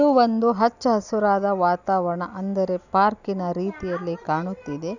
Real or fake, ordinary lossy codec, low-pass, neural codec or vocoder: real; none; 7.2 kHz; none